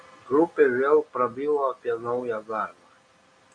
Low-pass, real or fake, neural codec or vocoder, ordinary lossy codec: 9.9 kHz; real; none; Opus, 64 kbps